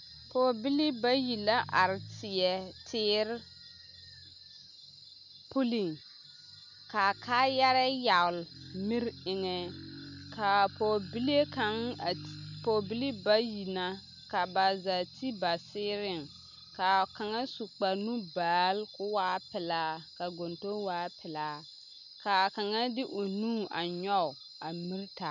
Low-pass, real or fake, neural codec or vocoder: 7.2 kHz; real; none